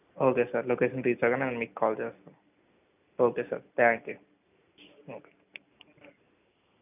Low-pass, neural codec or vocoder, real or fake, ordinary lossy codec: 3.6 kHz; none; real; none